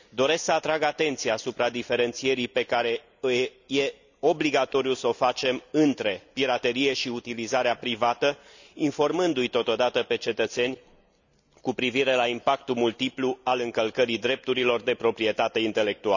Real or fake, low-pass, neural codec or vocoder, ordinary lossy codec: real; 7.2 kHz; none; none